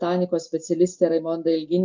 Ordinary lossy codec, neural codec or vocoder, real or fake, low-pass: Opus, 32 kbps; none; real; 7.2 kHz